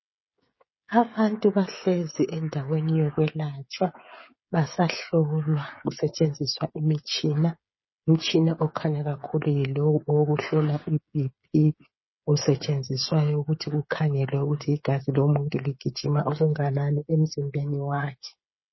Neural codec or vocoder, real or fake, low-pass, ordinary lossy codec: codec, 16 kHz, 16 kbps, FreqCodec, smaller model; fake; 7.2 kHz; MP3, 24 kbps